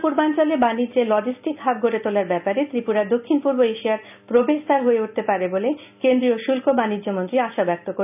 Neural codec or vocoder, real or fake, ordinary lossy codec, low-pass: none; real; none; 3.6 kHz